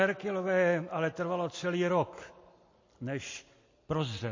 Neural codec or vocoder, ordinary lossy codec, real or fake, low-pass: none; MP3, 32 kbps; real; 7.2 kHz